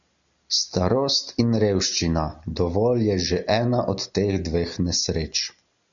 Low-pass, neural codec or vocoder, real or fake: 7.2 kHz; none; real